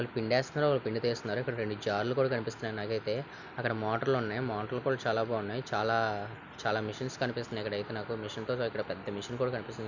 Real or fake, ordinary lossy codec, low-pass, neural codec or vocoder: real; none; 7.2 kHz; none